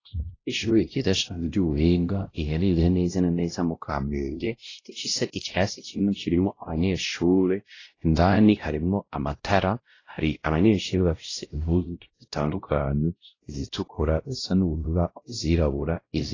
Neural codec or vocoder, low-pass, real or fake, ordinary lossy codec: codec, 16 kHz, 0.5 kbps, X-Codec, WavLM features, trained on Multilingual LibriSpeech; 7.2 kHz; fake; AAC, 32 kbps